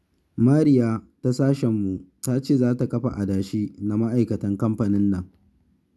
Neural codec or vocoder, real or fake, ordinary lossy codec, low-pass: none; real; none; none